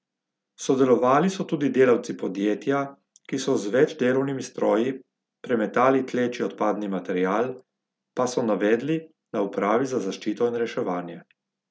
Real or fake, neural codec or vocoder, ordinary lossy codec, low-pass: real; none; none; none